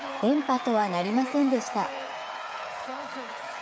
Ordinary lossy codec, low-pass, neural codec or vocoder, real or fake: none; none; codec, 16 kHz, 8 kbps, FreqCodec, smaller model; fake